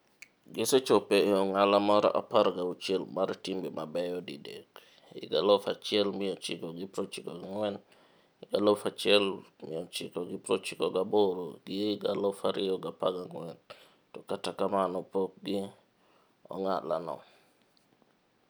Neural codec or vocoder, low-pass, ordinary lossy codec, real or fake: none; none; none; real